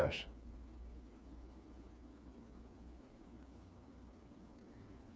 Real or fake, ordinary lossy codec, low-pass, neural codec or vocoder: fake; none; none; codec, 16 kHz, 4 kbps, FreqCodec, smaller model